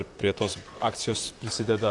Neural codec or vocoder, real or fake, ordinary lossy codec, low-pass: vocoder, 44.1 kHz, 128 mel bands, Pupu-Vocoder; fake; AAC, 64 kbps; 10.8 kHz